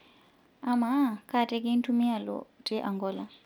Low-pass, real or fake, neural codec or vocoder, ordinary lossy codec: 19.8 kHz; real; none; none